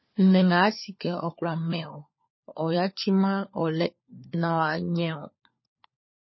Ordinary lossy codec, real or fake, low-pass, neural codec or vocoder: MP3, 24 kbps; fake; 7.2 kHz; codec, 16 kHz, 4 kbps, FunCodec, trained on LibriTTS, 50 frames a second